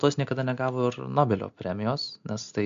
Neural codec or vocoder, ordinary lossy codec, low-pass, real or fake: none; MP3, 64 kbps; 7.2 kHz; real